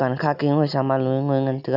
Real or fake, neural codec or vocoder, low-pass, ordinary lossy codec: real; none; 5.4 kHz; none